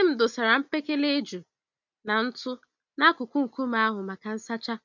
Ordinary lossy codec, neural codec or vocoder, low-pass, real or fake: none; none; 7.2 kHz; real